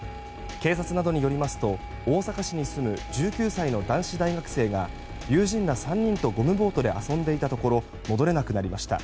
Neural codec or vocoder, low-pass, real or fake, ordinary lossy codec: none; none; real; none